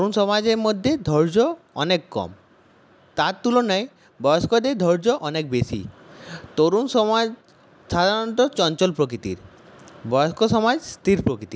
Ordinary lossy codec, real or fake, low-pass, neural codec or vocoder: none; real; none; none